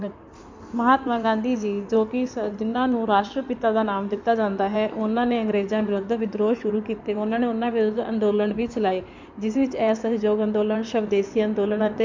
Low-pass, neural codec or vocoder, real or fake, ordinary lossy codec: 7.2 kHz; codec, 16 kHz in and 24 kHz out, 2.2 kbps, FireRedTTS-2 codec; fake; MP3, 64 kbps